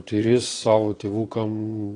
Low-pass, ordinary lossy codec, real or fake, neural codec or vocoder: 9.9 kHz; AAC, 32 kbps; fake; vocoder, 22.05 kHz, 80 mel bands, Vocos